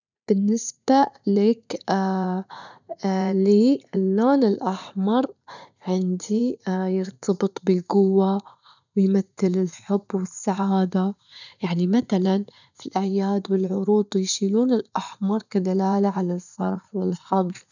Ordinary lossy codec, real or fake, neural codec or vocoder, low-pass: none; fake; vocoder, 44.1 kHz, 80 mel bands, Vocos; 7.2 kHz